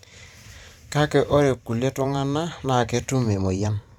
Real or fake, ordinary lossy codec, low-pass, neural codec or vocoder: fake; none; 19.8 kHz; vocoder, 44.1 kHz, 128 mel bands every 512 samples, BigVGAN v2